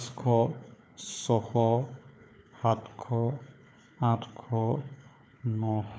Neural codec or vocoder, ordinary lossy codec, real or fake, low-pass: codec, 16 kHz, 4 kbps, FunCodec, trained on Chinese and English, 50 frames a second; none; fake; none